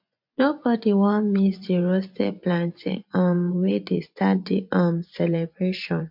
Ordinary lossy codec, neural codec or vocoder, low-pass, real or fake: MP3, 48 kbps; vocoder, 24 kHz, 100 mel bands, Vocos; 5.4 kHz; fake